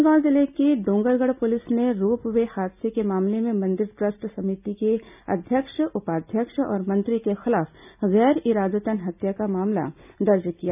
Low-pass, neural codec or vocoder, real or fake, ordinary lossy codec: 3.6 kHz; none; real; none